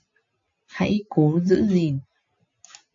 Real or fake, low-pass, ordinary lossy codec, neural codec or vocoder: real; 7.2 kHz; AAC, 64 kbps; none